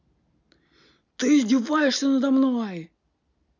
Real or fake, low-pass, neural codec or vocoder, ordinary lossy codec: real; 7.2 kHz; none; none